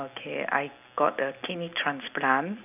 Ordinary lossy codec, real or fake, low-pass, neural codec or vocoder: none; real; 3.6 kHz; none